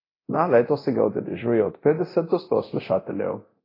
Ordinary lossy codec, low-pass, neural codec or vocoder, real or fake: AAC, 24 kbps; 5.4 kHz; codec, 24 kHz, 0.9 kbps, DualCodec; fake